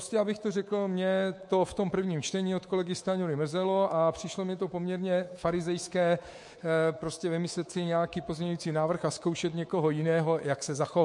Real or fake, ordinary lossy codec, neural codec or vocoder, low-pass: fake; MP3, 48 kbps; codec, 24 kHz, 3.1 kbps, DualCodec; 10.8 kHz